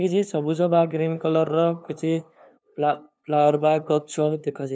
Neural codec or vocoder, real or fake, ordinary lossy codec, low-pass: codec, 16 kHz, 2 kbps, FunCodec, trained on LibriTTS, 25 frames a second; fake; none; none